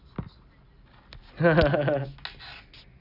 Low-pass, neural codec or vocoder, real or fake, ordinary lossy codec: 5.4 kHz; none; real; none